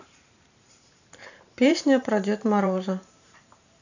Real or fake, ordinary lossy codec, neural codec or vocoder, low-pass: fake; none; vocoder, 44.1 kHz, 128 mel bands every 512 samples, BigVGAN v2; 7.2 kHz